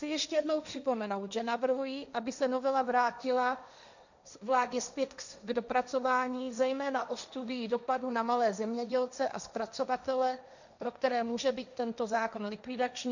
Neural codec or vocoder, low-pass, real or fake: codec, 16 kHz, 1.1 kbps, Voila-Tokenizer; 7.2 kHz; fake